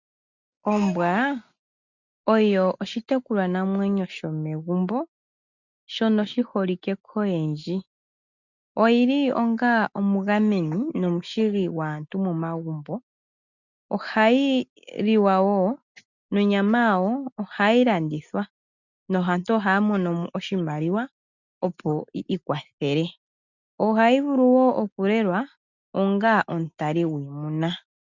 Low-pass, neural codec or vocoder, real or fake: 7.2 kHz; none; real